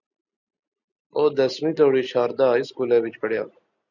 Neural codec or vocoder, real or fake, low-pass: none; real; 7.2 kHz